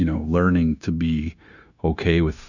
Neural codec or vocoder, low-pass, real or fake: codec, 16 kHz, 0.9 kbps, LongCat-Audio-Codec; 7.2 kHz; fake